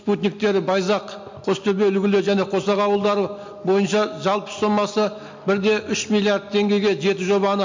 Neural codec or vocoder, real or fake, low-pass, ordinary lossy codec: none; real; 7.2 kHz; MP3, 48 kbps